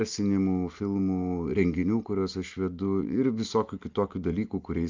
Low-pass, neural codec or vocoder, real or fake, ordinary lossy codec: 7.2 kHz; none; real; Opus, 24 kbps